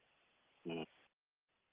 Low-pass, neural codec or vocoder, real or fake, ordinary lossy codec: 3.6 kHz; none; real; Opus, 32 kbps